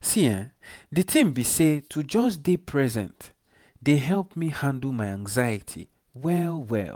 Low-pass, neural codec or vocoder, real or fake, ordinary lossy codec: none; vocoder, 48 kHz, 128 mel bands, Vocos; fake; none